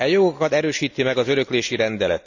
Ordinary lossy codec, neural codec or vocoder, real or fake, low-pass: none; none; real; 7.2 kHz